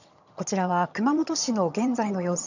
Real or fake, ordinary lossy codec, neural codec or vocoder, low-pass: fake; none; vocoder, 22.05 kHz, 80 mel bands, HiFi-GAN; 7.2 kHz